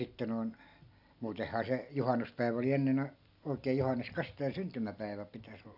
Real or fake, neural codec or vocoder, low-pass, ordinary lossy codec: real; none; 5.4 kHz; AAC, 48 kbps